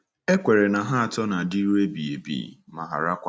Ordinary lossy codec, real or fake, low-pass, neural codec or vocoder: none; real; none; none